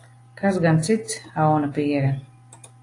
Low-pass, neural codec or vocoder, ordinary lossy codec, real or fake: 10.8 kHz; none; AAC, 48 kbps; real